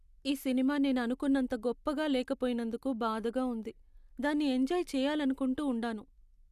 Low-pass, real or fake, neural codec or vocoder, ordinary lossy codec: 14.4 kHz; real; none; Opus, 64 kbps